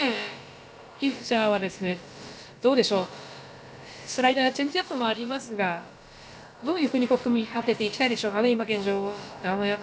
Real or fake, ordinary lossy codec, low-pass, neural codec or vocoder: fake; none; none; codec, 16 kHz, about 1 kbps, DyCAST, with the encoder's durations